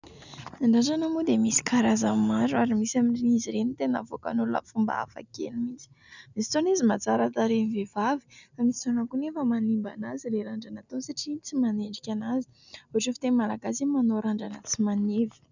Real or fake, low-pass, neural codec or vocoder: real; 7.2 kHz; none